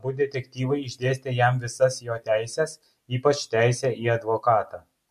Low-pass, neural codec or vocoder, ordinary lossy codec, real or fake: 14.4 kHz; none; MP3, 64 kbps; real